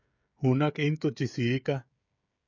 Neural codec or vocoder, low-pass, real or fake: codec, 16 kHz, 16 kbps, FreqCodec, smaller model; 7.2 kHz; fake